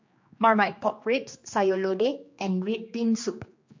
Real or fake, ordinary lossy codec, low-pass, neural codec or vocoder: fake; MP3, 48 kbps; 7.2 kHz; codec, 16 kHz, 2 kbps, X-Codec, HuBERT features, trained on general audio